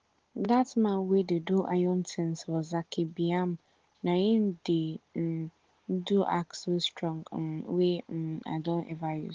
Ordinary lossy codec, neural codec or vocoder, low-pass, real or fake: Opus, 16 kbps; none; 7.2 kHz; real